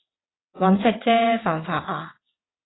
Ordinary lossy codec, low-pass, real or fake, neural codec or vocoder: AAC, 16 kbps; 7.2 kHz; fake; vocoder, 22.05 kHz, 80 mel bands, WaveNeXt